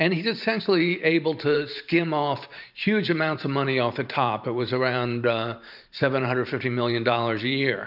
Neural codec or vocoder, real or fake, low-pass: vocoder, 44.1 kHz, 128 mel bands every 512 samples, BigVGAN v2; fake; 5.4 kHz